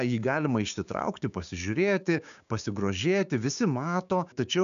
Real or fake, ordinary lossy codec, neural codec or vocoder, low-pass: fake; AAC, 96 kbps; codec, 16 kHz, 6 kbps, DAC; 7.2 kHz